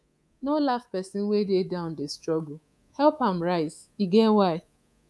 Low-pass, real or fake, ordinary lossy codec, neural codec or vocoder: none; fake; none; codec, 24 kHz, 3.1 kbps, DualCodec